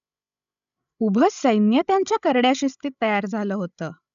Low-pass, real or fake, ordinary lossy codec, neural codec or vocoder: 7.2 kHz; fake; none; codec, 16 kHz, 16 kbps, FreqCodec, larger model